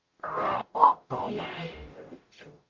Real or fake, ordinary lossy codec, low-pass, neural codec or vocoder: fake; Opus, 24 kbps; 7.2 kHz; codec, 44.1 kHz, 0.9 kbps, DAC